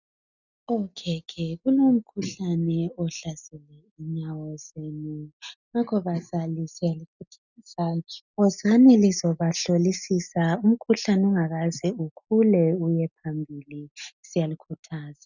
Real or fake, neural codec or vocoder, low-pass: real; none; 7.2 kHz